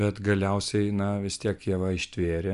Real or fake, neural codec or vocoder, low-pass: real; none; 10.8 kHz